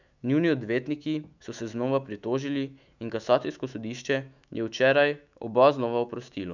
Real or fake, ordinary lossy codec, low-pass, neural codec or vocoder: fake; none; 7.2 kHz; autoencoder, 48 kHz, 128 numbers a frame, DAC-VAE, trained on Japanese speech